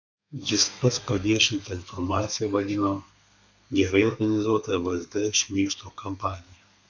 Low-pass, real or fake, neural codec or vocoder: 7.2 kHz; fake; codec, 32 kHz, 1.9 kbps, SNAC